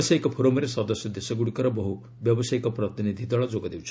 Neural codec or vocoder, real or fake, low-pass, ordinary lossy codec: none; real; none; none